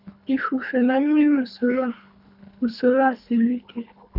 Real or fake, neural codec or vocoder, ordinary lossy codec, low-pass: fake; codec, 24 kHz, 3 kbps, HILCodec; none; 5.4 kHz